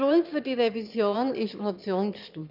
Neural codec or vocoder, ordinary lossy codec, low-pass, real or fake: autoencoder, 22.05 kHz, a latent of 192 numbers a frame, VITS, trained on one speaker; none; 5.4 kHz; fake